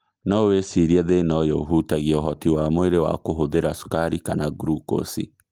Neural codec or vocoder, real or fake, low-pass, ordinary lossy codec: none; real; 19.8 kHz; Opus, 24 kbps